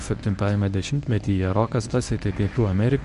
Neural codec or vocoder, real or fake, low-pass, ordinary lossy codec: codec, 24 kHz, 0.9 kbps, WavTokenizer, medium speech release version 1; fake; 10.8 kHz; AAC, 96 kbps